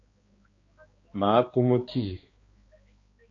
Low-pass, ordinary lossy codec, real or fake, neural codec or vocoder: 7.2 kHz; AAC, 32 kbps; fake; codec, 16 kHz, 2 kbps, X-Codec, HuBERT features, trained on balanced general audio